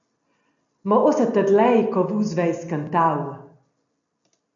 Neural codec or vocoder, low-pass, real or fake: none; 7.2 kHz; real